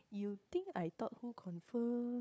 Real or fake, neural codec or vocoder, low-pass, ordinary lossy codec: fake; codec, 16 kHz, 4 kbps, FunCodec, trained on Chinese and English, 50 frames a second; none; none